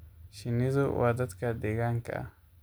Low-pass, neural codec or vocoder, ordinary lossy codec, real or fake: none; none; none; real